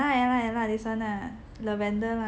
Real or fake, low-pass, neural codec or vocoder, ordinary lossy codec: real; none; none; none